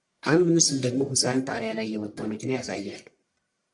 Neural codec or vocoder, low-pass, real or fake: codec, 44.1 kHz, 1.7 kbps, Pupu-Codec; 10.8 kHz; fake